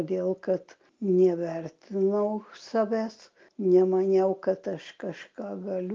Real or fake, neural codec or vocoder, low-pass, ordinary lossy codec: real; none; 7.2 kHz; Opus, 24 kbps